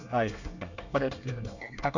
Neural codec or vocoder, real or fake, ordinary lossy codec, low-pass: codec, 24 kHz, 1 kbps, SNAC; fake; none; 7.2 kHz